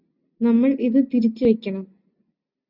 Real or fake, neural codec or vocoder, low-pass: real; none; 5.4 kHz